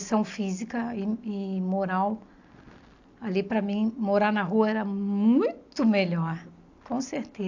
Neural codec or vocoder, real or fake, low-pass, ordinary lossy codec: none; real; 7.2 kHz; AAC, 48 kbps